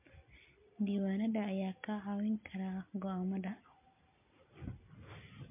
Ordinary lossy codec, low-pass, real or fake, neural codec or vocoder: MP3, 24 kbps; 3.6 kHz; real; none